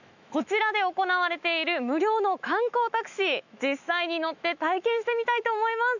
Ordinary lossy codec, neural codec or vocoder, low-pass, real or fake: none; autoencoder, 48 kHz, 128 numbers a frame, DAC-VAE, trained on Japanese speech; 7.2 kHz; fake